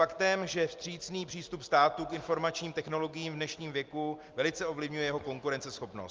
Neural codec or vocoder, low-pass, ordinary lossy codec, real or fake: none; 7.2 kHz; Opus, 32 kbps; real